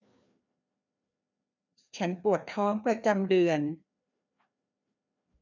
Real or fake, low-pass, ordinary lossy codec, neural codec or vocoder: fake; 7.2 kHz; none; codec, 16 kHz, 2 kbps, FreqCodec, larger model